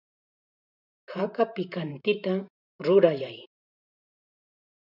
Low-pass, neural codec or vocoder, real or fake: 5.4 kHz; vocoder, 44.1 kHz, 128 mel bands every 512 samples, BigVGAN v2; fake